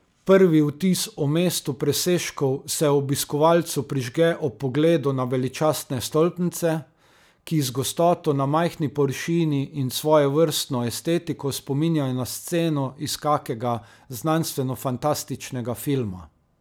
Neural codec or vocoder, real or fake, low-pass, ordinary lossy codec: none; real; none; none